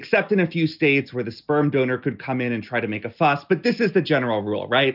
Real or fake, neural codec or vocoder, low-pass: real; none; 5.4 kHz